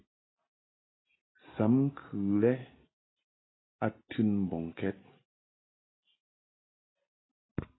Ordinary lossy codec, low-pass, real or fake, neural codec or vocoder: AAC, 16 kbps; 7.2 kHz; real; none